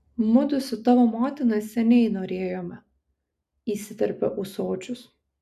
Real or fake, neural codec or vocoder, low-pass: real; none; 14.4 kHz